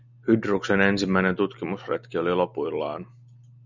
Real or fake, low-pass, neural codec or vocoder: real; 7.2 kHz; none